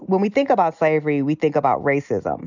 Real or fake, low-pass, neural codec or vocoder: real; 7.2 kHz; none